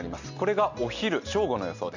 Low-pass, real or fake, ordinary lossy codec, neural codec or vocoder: 7.2 kHz; real; none; none